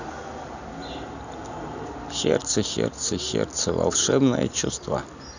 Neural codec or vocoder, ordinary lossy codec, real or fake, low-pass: none; none; real; 7.2 kHz